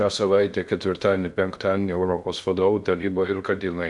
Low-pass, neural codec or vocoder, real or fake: 10.8 kHz; codec, 16 kHz in and 24 kHz out, 0.6 kbps, FocalCodec, streaming, 2048 codes; fake